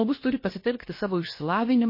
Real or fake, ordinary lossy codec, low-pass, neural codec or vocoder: fake; MP3, 24 kbps; 5.4 kHz; codec, 16 kHz, about 1 kbps, DyCAST, with the encoder's durations